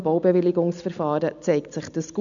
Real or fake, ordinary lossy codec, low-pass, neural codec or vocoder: real; none; 7.2 kHz; none